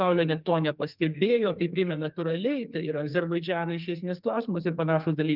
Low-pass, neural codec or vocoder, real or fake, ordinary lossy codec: 5.4 kHz; codec, 44.1 kHz, 2.6 kbps, SNAC; fake; Opus, 32 kbps